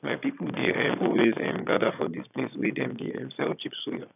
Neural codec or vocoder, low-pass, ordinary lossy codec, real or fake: vocoder, 22.05 kHz, 80 mel bands, Vocos; 3.6 kHz; none; fake